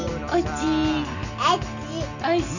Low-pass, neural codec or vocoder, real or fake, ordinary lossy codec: 7.2 kHz; none; real; none